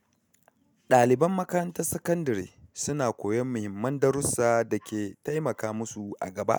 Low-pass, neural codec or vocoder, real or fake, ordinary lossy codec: none; none; real; none